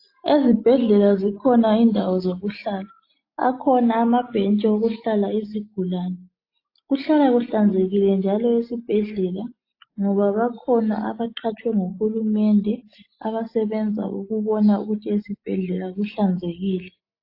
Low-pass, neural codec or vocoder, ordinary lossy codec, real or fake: 5.4 kHz; none; AAC, 32 kbps; real